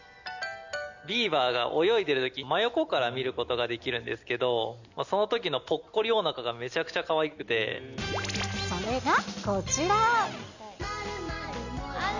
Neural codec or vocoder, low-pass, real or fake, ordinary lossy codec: none; 7.2 kHz; real; none